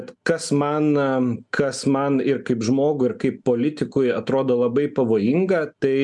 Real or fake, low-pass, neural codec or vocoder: real; 10.8 kHz; none